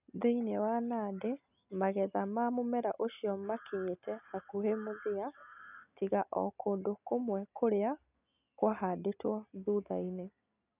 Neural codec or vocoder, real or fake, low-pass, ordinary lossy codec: none; real; 3.6 kHz; none